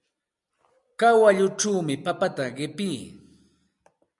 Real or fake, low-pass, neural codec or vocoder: real; 10.8 kHz; none